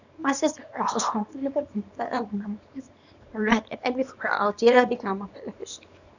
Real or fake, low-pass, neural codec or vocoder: fake; 7.2 kHz; codec, 24 kHz, 0.9 kbps, WavTokenizer, small release